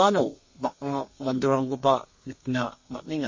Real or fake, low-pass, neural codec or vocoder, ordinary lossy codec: fake; 7.2 kHz; codec, 32 kHz, 1.9 kbps, SNAC; MP3, 32 kbps